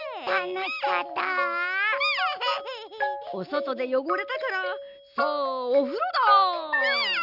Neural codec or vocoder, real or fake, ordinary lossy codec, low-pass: none; real; none; 5.4 kHz